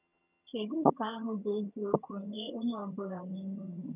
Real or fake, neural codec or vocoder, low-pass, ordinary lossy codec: fake; vocoder, 22.05 kHz, 80 mel bands, HiFi-GAN; 3.6 kHz; none